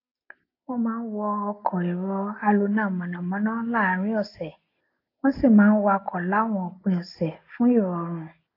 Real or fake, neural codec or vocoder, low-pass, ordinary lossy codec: real; none; 5.4 kHz; AAC, 32 kbps